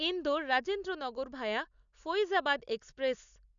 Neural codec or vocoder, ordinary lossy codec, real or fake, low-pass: none; none; real; 7.2 kHz